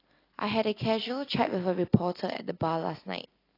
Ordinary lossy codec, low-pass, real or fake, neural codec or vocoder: AAC, 24 kbps; 5.4 kHz; real; none